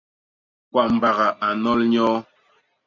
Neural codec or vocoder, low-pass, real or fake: none; 7.2 kHz; real